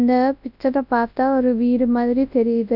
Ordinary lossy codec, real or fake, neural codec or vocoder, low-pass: none; fake; codec, 24 kHz, 0.9 kbps, WavTokenizer, large speech release; 5.4 kHz